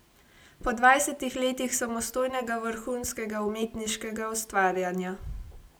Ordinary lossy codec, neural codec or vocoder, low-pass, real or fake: none; none; none; real